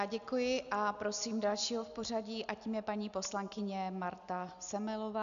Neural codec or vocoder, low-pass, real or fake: none; 7.2 kHz; real